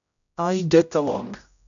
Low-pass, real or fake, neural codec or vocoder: 7.2 kHz; fake; codec, 16 kHz, 0.5 kbps, X-Codec, HuBERT features, trained on general audio